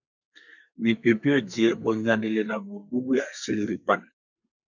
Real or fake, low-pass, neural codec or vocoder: fake; 7.2 kHz; codec, 32 kHz, 1.9 kbps, SNAC